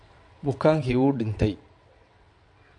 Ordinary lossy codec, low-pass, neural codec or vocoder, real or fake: MP3, 48 kbps; 9.9 kHz; vocoder, 22.05 kHz, 80 mel bands, WaveNeXt; fake